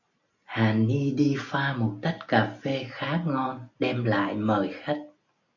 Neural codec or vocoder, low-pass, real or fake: none; 7.2 kHz; real